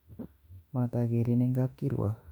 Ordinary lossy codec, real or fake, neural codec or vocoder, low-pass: none; fake; autoencoder, 48 kHz, 32 numbers a frame, DAC-VAE, trained on Japanese speech; 19.8 kHz